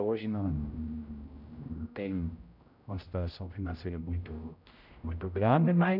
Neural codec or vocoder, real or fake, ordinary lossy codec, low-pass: codec, 16 kHz, 0.5 kbps, X-Codec, HuBERT features, trained on general audio; fake; none; 5.4 kHz